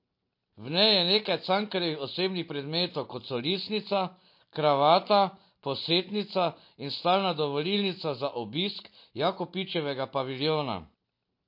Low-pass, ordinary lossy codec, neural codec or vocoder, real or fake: 5.4 kHz; MP3, 32 kbps; none; real